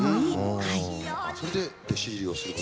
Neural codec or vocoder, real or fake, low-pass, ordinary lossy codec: none; real; none; none